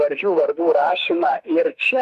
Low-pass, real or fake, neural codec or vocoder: 14.4 kHz; fake; autoencoder, 48 kHz, 32 numbers a frame, DAC-VAE, trained on Japanese speech